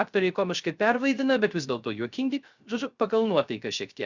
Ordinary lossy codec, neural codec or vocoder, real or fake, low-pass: Opus, 64 kbps; codec, 16 kHz, 0.3 kbps, FocalCodec; fake; 7.2 kHz